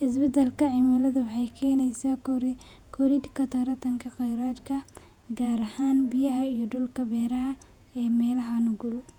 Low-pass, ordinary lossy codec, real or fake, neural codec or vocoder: 19.8 kHz; none; fake; vocoder, 48 kHz, 128 mel bands, Vocos